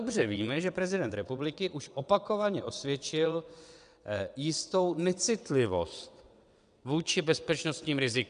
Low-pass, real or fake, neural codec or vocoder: 9.9 kHz; fake; vocoder, 22.05 kHz, 80 mel bands, WaveNeXt